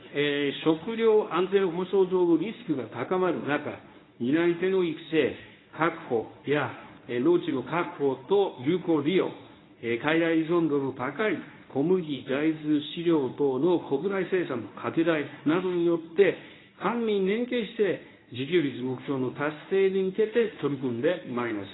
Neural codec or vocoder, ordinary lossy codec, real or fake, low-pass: codec, 24 kHz, 0.9 kbps, WavTokenizer, medium speech release version 1; AAC, 16 kbps; fake; 7.2 kHz